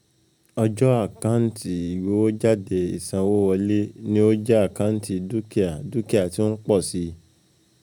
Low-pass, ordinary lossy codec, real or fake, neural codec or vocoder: 19.8 kHz; none; real; none